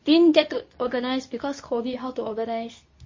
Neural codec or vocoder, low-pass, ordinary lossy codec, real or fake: codec, 24 kHz, 0.9 kbps, WavTokenizer, medium speech release version 2; 7.2 kHz; MP3, 32 kbps; fake